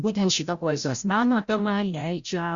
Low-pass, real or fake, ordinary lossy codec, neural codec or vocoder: 7.2 kHz; fake; Opus, 64 kbps; codec, 16 kHz, 0.5 kbps, FreqCodec, larger model